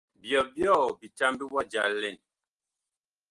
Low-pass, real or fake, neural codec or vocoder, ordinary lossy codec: 10.8 kHz; real; none; Opus, 24 kbps